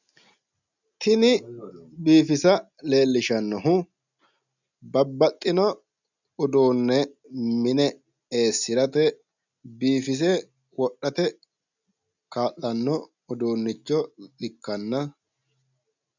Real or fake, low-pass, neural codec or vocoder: real; 7.2 kHz; none